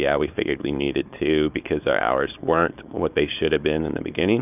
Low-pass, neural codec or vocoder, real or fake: 3.6 kHz; codec, 16 kHz, 4.8 kbps, FACodec; fake